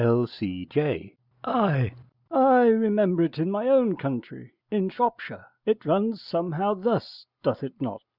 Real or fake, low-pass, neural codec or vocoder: real; 5.4 kHz; none